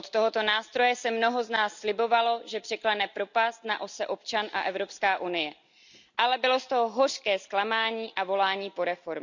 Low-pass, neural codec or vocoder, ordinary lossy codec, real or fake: 7.2 kHz; none; none; real